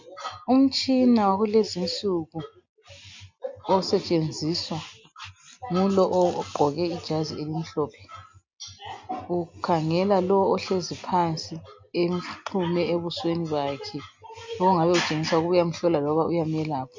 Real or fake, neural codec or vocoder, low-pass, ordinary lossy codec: real; none; 7.2 kHz; MP3, 48 kbps